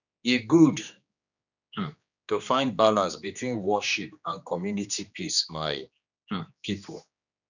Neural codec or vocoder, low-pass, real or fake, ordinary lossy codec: codec, 16 kHz, 2 kbps, X-Codec, HuBERT features, trained on general audio; 7.2 kHz; fake; none